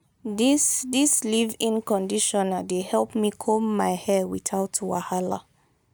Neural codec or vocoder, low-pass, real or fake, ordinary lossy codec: none; none; real; none